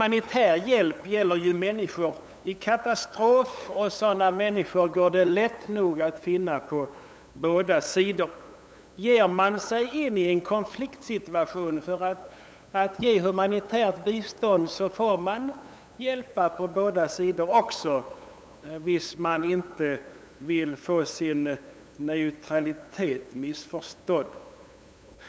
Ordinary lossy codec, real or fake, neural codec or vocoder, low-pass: none; fake; codec, 16 kHz, 8 kbps, FunCodec, trained on LibriTTS, 25 frames a second; none